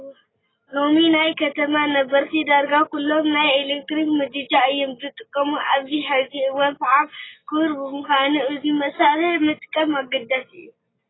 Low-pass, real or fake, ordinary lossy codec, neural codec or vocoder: 7.2 kHz; real; AAC, 16 kbps; none